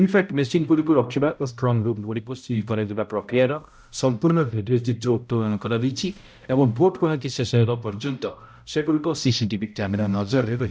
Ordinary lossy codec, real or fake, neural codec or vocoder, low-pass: none; fake; codec, 16 kHz, 0.5 kbps, X-Codec, HuBERT features, trained on balanced general audio; none